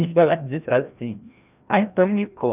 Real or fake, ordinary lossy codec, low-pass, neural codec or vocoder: fake; none; 3.6 kHz; codec, 16 kHz, 1 kbps, FreqCodec, larger model